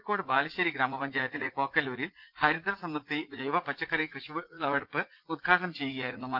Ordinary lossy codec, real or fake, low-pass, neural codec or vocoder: Opus, 32 kbps; fake; 5.4 kHz; vocoder, 44.1 kHz, 80 mel bands, Vocos